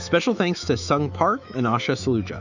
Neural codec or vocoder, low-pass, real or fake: autoencoder, 48 kHz, 128 numbers a frame, DAC-VAE, trained on Japanese speech; 7.2 kHz; fake